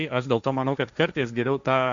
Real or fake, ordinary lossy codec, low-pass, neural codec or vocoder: fake; Opus, 64 kbps; 7.2 kHz; codec, 16 kHz, 1.1 kbps, Voila-Tokenizer